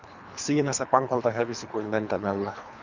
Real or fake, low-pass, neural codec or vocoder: fake; 7.2 kHz; codec, 24 kHz, 3 kbps, HILCodec